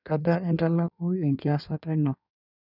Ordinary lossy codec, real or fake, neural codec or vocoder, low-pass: none; fake; codec, 16 kHz in and 24 kHz out, 1.1 kbps, FireRedTTS-2 codec; 5.4 kHz